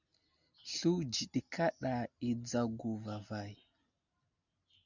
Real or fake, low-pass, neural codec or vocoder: real; 7.2 kHz; none